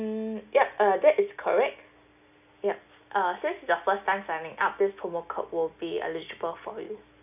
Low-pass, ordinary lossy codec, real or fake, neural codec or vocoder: 3.6 kHz; none; real; none